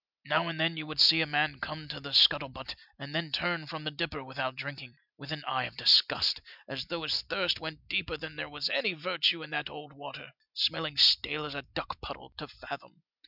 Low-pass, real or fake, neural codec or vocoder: 5.4 kHz; real; none